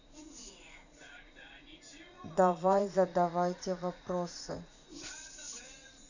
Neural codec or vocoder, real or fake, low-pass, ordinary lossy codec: vocoder, 44.1 kHz, 80 mel bands, Vocos; fake; 7.2 kHz; none